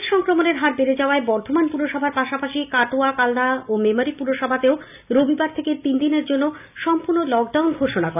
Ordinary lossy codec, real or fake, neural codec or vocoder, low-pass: none; real; none; 3.6 kHz